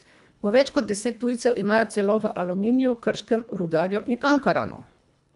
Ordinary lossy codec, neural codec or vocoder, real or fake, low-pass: AAC, 96 kbps; codec, 24 kHz, 1.5 kbps, HILCodec; fake; 10.8 kHz